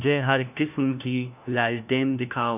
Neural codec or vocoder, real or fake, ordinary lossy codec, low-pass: codec, 16 kHz, 1 kbps, FunCodec, trained on LibriTTS, 50 frames a second; fake; none; 3.6 kHz